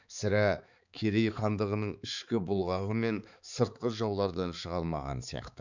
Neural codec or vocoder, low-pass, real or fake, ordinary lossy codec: codec, 16 kHz, 4 kbps, X-Codec, HuBERT features, trained on balanced general audio; 7.2 kHz; fake; none